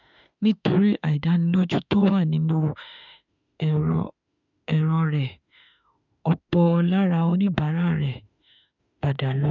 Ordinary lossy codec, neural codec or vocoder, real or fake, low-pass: none; autoencoder, 48 kHz, 32 numbers a frame, DAC-VAE, trained on Japanese speech; fake; 7.2 kHz